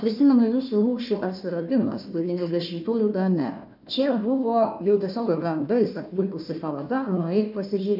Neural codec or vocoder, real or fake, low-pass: codec, 16 kHz, 1 kbps, FunCodec, trained on Chinese and English, 50 frames a second; fake; 5.4 kHz